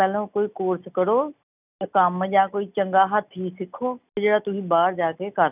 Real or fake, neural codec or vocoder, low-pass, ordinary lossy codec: real; none; 3.6 kHz; none